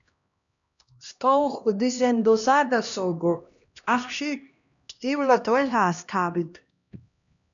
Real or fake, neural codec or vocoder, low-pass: fake; codec, 16 kHz, 1 kbps, X-Codec, HuBERT features, trained on LibriSpeech; 7.2 kHz